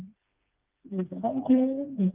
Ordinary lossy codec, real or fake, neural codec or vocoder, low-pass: Opus, 24 kbps; fake; codec, 16 kHz, 2 kbps, FreqCodec, smaller model; 3.6 kHz